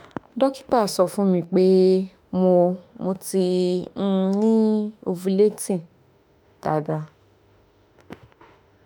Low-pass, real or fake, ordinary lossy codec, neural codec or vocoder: none; fake; none; autoencoder, 48 kHz, 32 numbers a frame, DAC-VAE, trained on Japanese speech